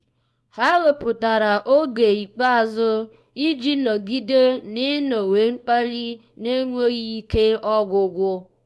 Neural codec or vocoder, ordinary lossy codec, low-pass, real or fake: codec, 24 kHz, 0.9 kbps, WavTokenizer, small release; none; none; fake